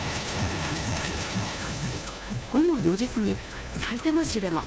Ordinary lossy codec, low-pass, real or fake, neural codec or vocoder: none; none; fake; codec, 16 kHz, 1 kbps, FunCodec, trained on LibriTTS, 50 frames a second